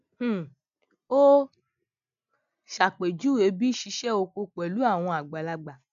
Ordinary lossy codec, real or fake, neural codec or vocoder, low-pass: none; real; none; 7.2 kHz